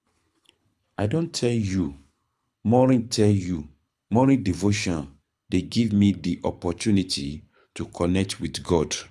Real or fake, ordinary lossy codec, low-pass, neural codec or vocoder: fake; none; none; codec, 24 kHz, 6 kbps, HILCodec